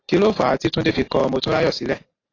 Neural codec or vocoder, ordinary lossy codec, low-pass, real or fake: none; AAC, 32 kbps; 7.2 kHz; real